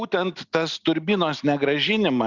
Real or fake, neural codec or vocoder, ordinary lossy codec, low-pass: real; none; Opus, 64 kbps; 7.2 kHz